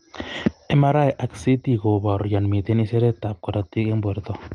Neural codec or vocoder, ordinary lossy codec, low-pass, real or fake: none; Opus, 24 kbps; 9.9 kHz; real